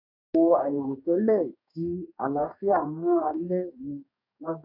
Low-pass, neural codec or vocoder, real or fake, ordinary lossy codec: 5.4 kHz; codec, 44.1 kHz, 2.6 kbps, DAC; fake; none